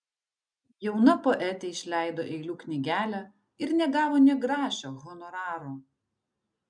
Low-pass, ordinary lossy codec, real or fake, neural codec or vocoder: 9.9 kHz; MP3, 96 kbps; real; none